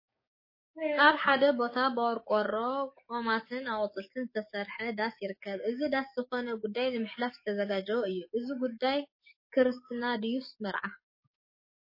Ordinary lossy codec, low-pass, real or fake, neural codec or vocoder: MP3, 24 kbps; 5.4 kHz; fake; codec, 44.1 kHz, 7.8 kbps, DAC